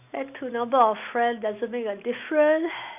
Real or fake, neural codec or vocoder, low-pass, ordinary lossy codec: real; none; 3.6 kHz; none